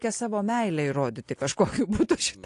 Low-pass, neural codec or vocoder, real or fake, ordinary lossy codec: 10.8 kHz; none; real; AAC, 48 kbps